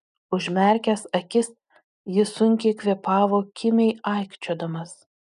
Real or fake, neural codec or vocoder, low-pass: real; none; 10.8 kHz